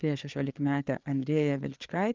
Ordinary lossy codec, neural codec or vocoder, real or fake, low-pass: Opus, 32 kbps; codec, 16 kHz, 4 kbps, FreqCodec, larger model; fake; 7.2 kHz